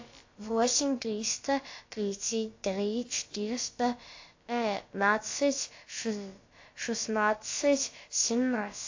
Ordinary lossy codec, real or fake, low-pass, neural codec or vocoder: AAC, 48 kbps; fake; 7.2 kHz; codec, 16 kHz, about 1 kbps, DyCAST, with the encoder's durations